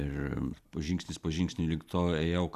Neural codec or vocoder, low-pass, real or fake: none; 14.4 kHz; real